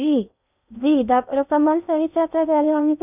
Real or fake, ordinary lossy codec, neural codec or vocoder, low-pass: fake; none; codec, 16 kHz in and 24 kHz out, 0.6 kbps, FocalCodec, streaming, 2048 codes; 3.6 kHz